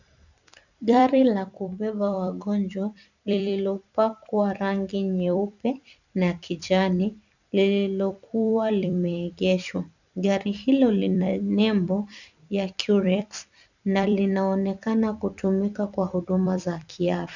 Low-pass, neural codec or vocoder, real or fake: 7.2 kHz; vocoder, 44.1 kHz, 128 mel bands every 256 samples, BigVGAN v2; fake